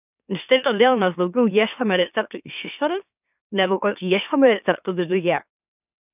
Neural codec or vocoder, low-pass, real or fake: autoencoder, 44.1 kHz, a latent of 192 numbers a frame, MeloTTS; 3.6 kHz; fake